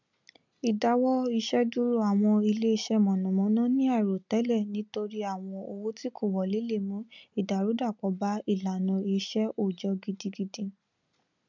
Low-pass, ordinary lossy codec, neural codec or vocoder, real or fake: 7.2 kHz; none; none; real